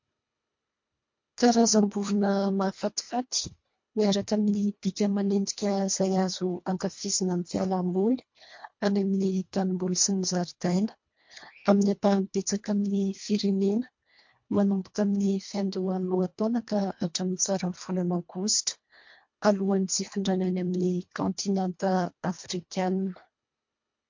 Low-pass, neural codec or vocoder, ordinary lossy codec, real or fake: 7.2 kHz; codec, 24 kHz, 1.5 kbps, HILCodec; MP3, 48 kbps; fake